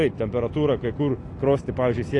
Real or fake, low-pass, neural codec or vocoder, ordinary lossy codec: real; 10.8 kHz; none; AAC, 48 kbps